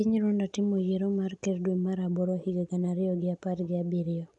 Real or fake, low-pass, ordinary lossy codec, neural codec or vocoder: real; 10.8 kHz; none; none